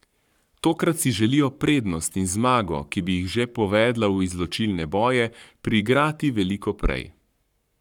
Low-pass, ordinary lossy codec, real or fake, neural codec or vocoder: 19.8 kHz; none; fake; codec, 44.1 kHz, 7.8 kbps, Pupu-Codec